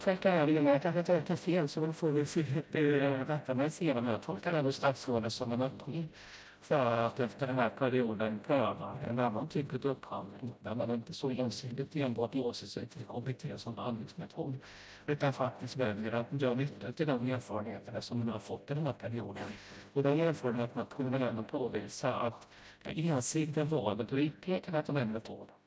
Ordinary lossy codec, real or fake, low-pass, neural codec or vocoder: none; fake; none; codec, 16 kHz, 0.5 kbps, FreqCodec, smaller model